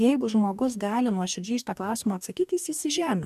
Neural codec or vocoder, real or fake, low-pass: codec, 44.1 kHz, 2.6 kbps, DAC; fake; 14.4 kHz